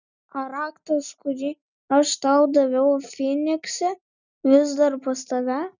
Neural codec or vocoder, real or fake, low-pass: none; real; 7.2 kHz